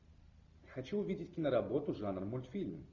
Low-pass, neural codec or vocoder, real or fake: 7.2 kHz; none; real